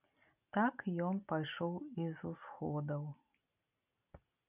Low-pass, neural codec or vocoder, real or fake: 3.6 kHz; none; real